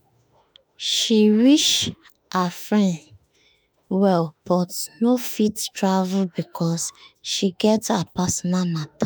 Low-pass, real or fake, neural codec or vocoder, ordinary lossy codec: none; fake; autoencoder, 48 kHz, 32 numbers a frame, DAC-VAE, trained on Japanese speech; none